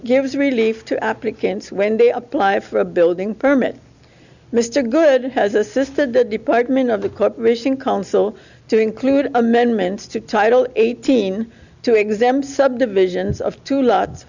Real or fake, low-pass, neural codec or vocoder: real; 7.2 kHz; none